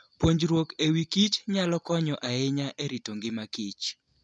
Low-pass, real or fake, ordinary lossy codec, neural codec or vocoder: none; real; none; none